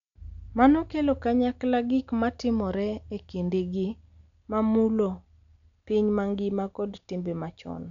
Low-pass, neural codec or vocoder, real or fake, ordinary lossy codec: 7.2 kHz; none; real; none